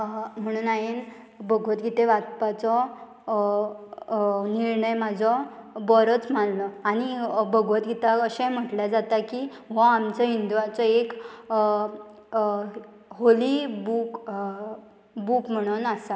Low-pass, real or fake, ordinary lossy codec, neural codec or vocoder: none; real; none; none